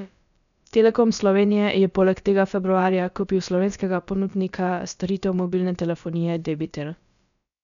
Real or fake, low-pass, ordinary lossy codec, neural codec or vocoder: fake; 7.2 kHz; none; codec, 16 kHz, about 1 kbps, DyCAST, with the encoder's durations